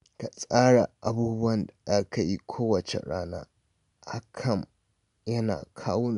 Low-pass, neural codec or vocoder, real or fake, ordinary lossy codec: 10.8 kHz; none; real; none